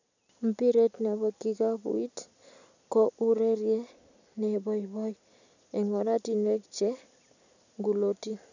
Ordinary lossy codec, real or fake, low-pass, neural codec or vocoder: none; real; 7.2 kHz; none